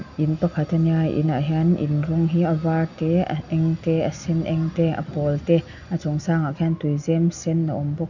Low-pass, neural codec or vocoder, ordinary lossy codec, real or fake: 7.2 kHz; none; none; real